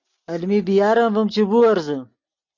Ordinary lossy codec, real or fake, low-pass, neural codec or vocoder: MP3, 48 kbps; real; 7.2 kHz; none